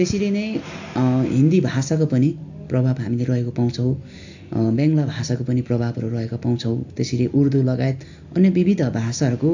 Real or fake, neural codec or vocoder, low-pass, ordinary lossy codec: real; none; 7.2 kHz; AAC, 48 kbps